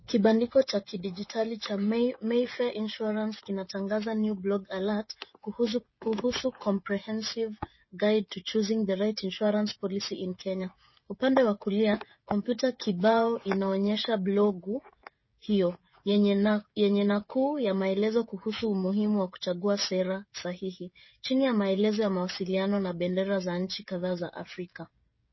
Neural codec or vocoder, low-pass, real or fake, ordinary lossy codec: codec, 16 kHz, 16 kbps, FreqCodec, smaller model; 7.2 kHz; fake; MP3, 24 kbps